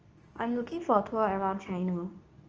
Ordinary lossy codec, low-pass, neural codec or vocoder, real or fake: Opus, 24 kbps; 7.2 kHz; codec, 24 kHz, 0.9 kbps, WavTokenizer, medium speech release version 2; fake